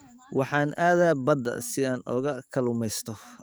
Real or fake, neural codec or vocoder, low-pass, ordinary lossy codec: fake; codec, 44.1 kHz, 7.8 kbps, DAC; none; none